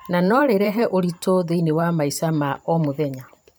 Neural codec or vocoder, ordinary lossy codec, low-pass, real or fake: vocoder, 44.1 kHz, 128 mel bands every 512 samples, BigVGAN v2; none; none; fake